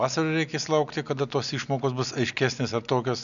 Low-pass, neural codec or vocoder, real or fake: 7.2 kHz; none; real